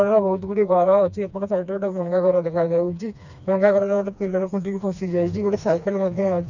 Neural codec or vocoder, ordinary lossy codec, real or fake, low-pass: codec, 16 kHz, 2 kbps, FreqCodec, smaller model; none; fake; 7.2 kHz